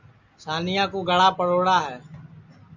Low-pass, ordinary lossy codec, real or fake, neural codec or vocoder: 7.2 kHz; Opus, 64 kbps; real; none